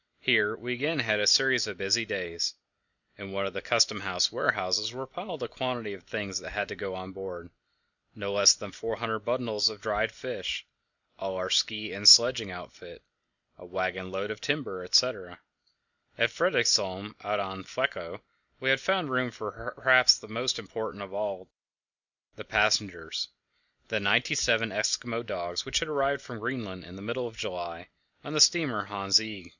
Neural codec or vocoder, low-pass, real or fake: none; 7.2 kHz; real